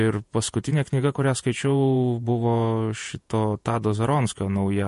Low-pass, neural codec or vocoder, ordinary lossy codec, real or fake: 14.4 kHz; vocoder, 48 kHz, 128 mel bands, Vocos; MP3, 48 kbps; fake